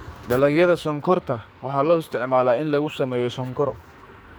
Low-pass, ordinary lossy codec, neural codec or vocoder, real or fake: none; none; codec, 44.1 kHz, 2.6 kbps, SNAC; fake